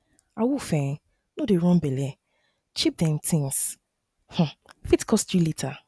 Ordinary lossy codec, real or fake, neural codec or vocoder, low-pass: none; real; none; none